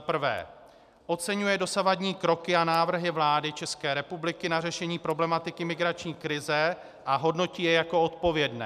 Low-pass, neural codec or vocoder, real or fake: 14.4 kHz; none; real